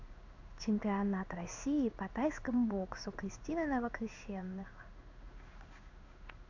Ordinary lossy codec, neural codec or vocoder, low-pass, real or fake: AAC, 48 kbps; codec, 16 kHz in and 24 kHz out, 1 kbps, XY-Tokenizer; 7.2 kHz; fake